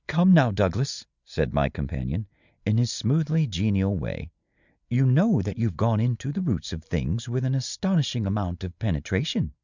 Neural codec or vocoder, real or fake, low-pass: none; real; 7.2 kHz